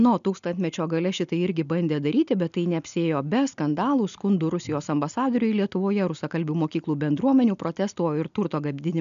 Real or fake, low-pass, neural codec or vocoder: real; 7.2 kHz; none